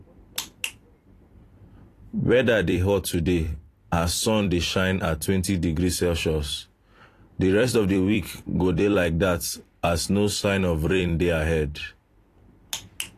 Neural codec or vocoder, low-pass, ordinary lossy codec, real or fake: vocoder, 44.1 kHz, 128 mel bands every 256 samples, BigVGAN v2; 14.4 kHz; AAC, 48 kbps; fake